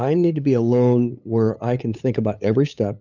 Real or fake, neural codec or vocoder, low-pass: fake; codec, 16 kHz, 8 kbps, FunCodec, trained on LibriTTS, 25 frames a second; 7.2 kHz